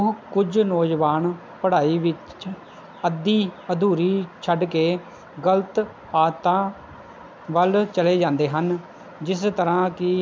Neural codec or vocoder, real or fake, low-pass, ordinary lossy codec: none; real; none; none